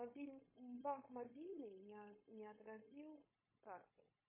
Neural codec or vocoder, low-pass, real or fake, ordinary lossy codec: codec, 16 kHz, 0.9 kbps, LongCat-Audio-Codec; 3.6 kHz; fake; MP3, 16 kbps